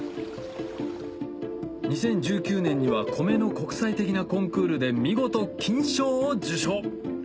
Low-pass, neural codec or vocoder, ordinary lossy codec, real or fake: none; none; none; real